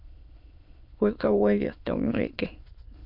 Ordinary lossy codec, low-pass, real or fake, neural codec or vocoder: MP3, 48 kbps; 5.4 kHz; fake; autoencoder, 22.05 kHz, a latent of 192 numbers a frame, VITS, trained on many speakers